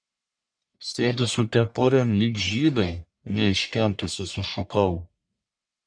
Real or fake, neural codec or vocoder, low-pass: fake; codec, 44.1 kHz, 1.7 kbps, Pupu-Codec; 9.9 kHz